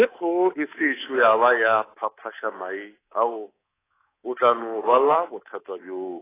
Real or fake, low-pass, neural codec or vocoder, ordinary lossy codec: fake; 3.6 kHz; codec, 24 kHz, 3.1 kbps, DualCodec; AAC, 16 kbps